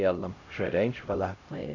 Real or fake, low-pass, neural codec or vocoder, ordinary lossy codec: fake; 7.2 kHz; codec, 16 kHz, 0.5 kbps, X-Codec, HuBERT features, trained on LibriSpeech; none